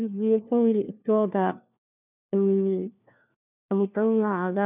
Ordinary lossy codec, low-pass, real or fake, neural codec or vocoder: none; 3.6 kHz; fake; codec, 16 kHz, 1 kbps, FunCodec, trained on LibriTTS, 50 frames a second